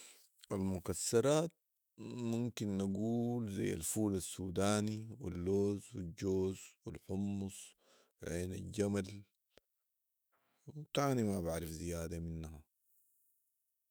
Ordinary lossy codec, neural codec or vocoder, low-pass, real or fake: none; autoencoder, 48 kHz, 128 numbers a frame, DAC-VAE, trained on Japanese speech; none; fake